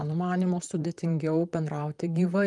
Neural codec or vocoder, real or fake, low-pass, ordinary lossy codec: vocoder, 44.1 kHz, 128 mel bands, Pupu-Vocoder; fake; 10.8 kHz; Opus, 32 kbps